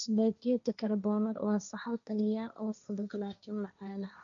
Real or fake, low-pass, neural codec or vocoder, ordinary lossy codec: fake; 7.2 kHz; codec, 16 kHz, 1.1 kbps, Voila-Tokenizer; none